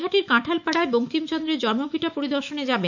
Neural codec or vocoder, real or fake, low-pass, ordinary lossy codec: autoencoder, 48 kHz, 128 numbers a frame, DAC-VAE, trained on Japanese speech; fake; 7.2 kHz; none